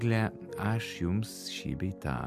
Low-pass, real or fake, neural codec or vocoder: 14.4 kHz; real; none